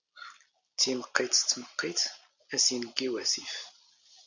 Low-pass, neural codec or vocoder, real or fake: 7.2 kHz; none; real